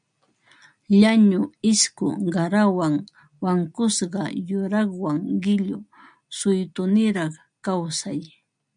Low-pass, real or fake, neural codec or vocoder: 9.9 kHz; real; none